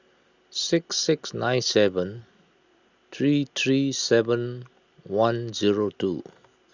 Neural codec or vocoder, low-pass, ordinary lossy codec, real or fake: none; 7.2 kHz; Opus, 64 kbps; real